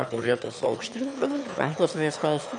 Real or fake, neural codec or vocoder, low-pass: fake; autoencoder, 22.05 kHz, a latent of 192 numbers a frame, VITS, trained on one speaker; 9.9 kHz